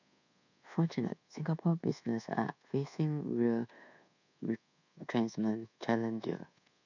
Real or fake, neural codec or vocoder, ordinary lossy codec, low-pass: fake; codec, 24 kHz, 1.2 kbps, DualCodec; none; 7.2 kHz